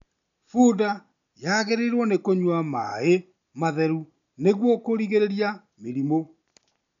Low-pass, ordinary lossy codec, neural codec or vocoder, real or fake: 7.2 kHz; MP3, 64 kbps; none; real